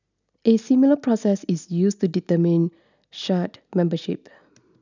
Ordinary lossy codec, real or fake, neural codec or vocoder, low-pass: none; real; none; 7.2 kHz